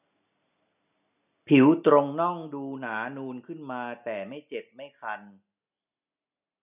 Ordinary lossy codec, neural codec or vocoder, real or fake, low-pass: none; none; real; 3.6 kHz